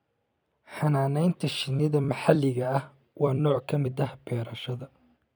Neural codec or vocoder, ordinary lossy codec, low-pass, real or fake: vocoder, 44.1 kHz, 128 mel bands every 256 samples, BigVGAN v2; none; none; fake